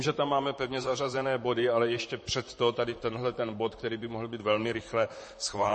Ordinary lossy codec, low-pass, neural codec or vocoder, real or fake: MP3, 32 kbps; 10.8 kHz; vocoder, 44.1 kHz, 128 mel bands, Pupu-Vocoder; fake